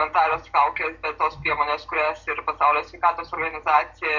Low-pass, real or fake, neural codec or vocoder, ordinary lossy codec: 7.2 kHz; real; none; Opus, 64 kbps